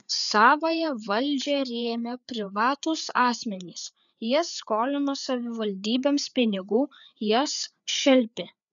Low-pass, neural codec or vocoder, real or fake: 7.2 kHz; codec, 16 kHz, 4 kbps, FreqCodec, larger model; fake